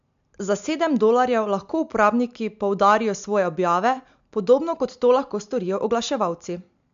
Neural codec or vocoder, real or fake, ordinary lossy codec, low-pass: none; real; MP3, 64 kbps; 7.2 kHz